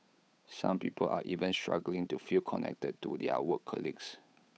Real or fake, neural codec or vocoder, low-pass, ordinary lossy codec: fake; codec, 16 kHz, 8 kbps, FunCodec, trained on Chinese and English, 25 frames a second; none; none